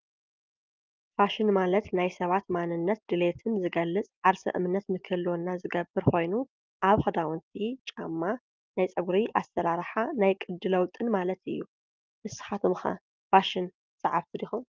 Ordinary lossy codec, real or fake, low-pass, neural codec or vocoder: Opus, 32 kbps; real; 7.2 kHz; none